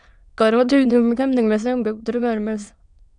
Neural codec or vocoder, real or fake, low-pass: autoencoder, 22.05 kHz, a latent of 192 numbers a frame, VITS, trained on many speakers; fake; 9.9 kHz